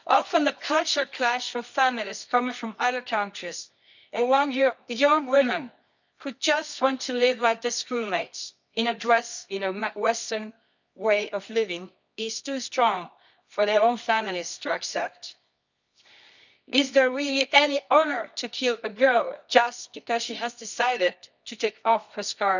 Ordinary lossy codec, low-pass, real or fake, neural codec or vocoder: none; 7.2 kHz; fake; codec, 24 kHz, 0.9 kbps, WavTokenizer, medium music audio release